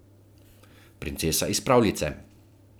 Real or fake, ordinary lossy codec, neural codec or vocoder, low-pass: fake; none; vocoder, 44.1 kHz, 128 mel bands every 256 samples, BigVGAN v2; none